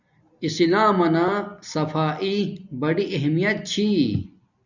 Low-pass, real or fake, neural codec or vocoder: 7.2 kHz; real; none